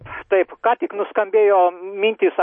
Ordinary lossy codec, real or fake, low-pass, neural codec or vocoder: MP3, 32 kbps; real; 9.9 kHz; none